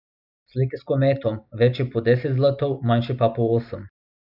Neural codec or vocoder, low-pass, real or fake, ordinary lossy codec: none; 5.4 kHz; real; none